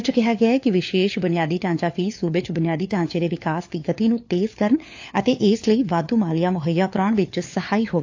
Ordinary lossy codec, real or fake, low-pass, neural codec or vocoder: AAC, 48 kbps; fake; 7.2 kHz; codec, 16 kHz, 4 kbps, FunCodec, trained on LibriTTS, 50 frames a second